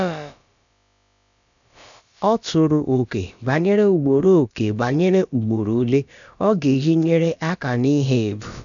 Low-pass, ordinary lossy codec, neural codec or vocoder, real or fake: 7.2 kHz; none; codec, 16 kHz, about 1 kbps, DyCAST, with the encoder's durations; fake